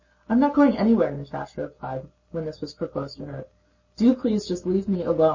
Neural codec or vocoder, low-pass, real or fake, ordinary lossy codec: none; 7.2 kHz; real; MP3, 32 kbps